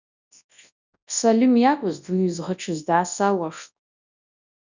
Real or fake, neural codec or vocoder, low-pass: fake; codec, 24 kHz, 0.9 kbps, WavTokenizer, large speech release; 7.2 kHz